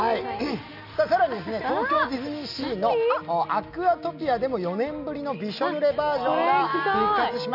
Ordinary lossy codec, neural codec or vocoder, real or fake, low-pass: none; none; real; 5.4 kHz